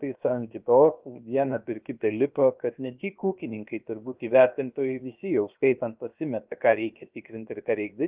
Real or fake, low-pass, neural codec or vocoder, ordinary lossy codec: fake; 3.6 kHz; codec, 16 kHz, 0.7 kbps, FocalCodec; Opus, 24 kbps